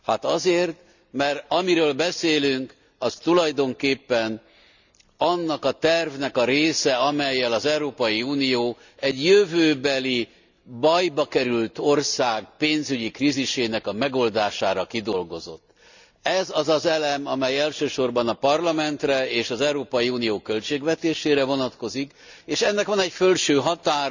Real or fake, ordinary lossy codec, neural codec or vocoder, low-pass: real; none; none; 7.2 kHz